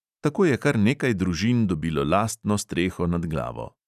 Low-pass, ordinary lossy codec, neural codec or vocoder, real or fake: 14.4 kHz; none; none; real